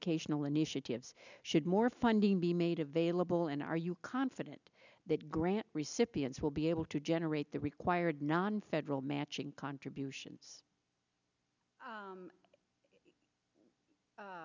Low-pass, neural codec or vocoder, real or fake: 7.2 kHz; none; real